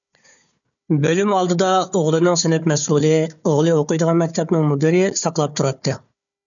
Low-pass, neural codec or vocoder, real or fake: 7.2 kHz; codec, 16 kHz, 4 kbps, FunCodec, trained on Chinese and English, 50 frames a second; fake